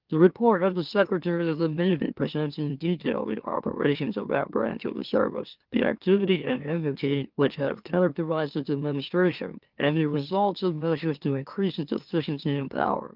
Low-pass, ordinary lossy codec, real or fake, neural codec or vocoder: 5.4 kHz; Opus, 24 kbps; fake; autoencoder, 44.1 kHz, a latent of 192 numbers a frame, MeloTTS